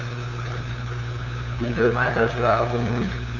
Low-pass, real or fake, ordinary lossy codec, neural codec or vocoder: 7.2 kHz; fake; none; codec, 16 kHz, 2 kbps, FunCodec, trained on LibriTTS, 25 frames a second